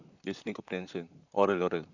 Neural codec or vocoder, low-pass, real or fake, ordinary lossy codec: vocoder, 44.1 kHz, 128 mel bands, Pupu-Vocoder; 7.2 kHz; fake; none